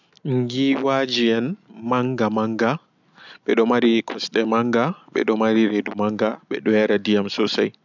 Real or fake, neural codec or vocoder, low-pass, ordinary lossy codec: real; none; 7.2 kHz; none